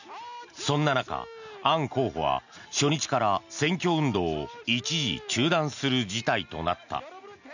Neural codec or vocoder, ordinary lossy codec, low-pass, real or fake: none; none; 7.2 kHz; real